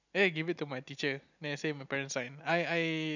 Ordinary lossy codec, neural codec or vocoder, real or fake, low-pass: none; none; real; 7.2 kHz